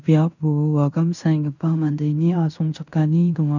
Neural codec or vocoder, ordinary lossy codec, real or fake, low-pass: codec, 16 kHz in and 24 kHz out, 0.9 kbps, LongCat-Audio-Codec, fine tuned four codebook decoder; none; fake; 7.2 kHz